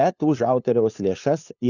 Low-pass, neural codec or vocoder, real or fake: 7.2 kHz; codec, 16 kHz, 2 kbps, FunCodec, trained on LibriTTS, 25 frames a second; fake